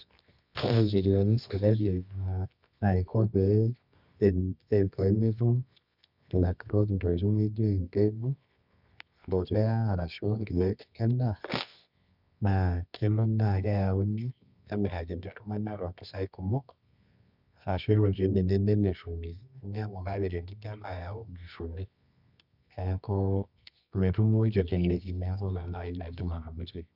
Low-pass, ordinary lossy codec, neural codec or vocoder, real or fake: 5.4 kHz; none; codec, 24 kHz, 0.9 kbps, WavTokenizer, medium music audio release; fake